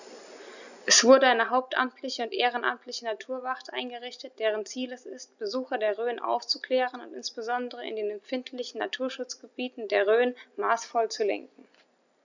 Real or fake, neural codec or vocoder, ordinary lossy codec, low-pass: real; none; none; 7.2 kHz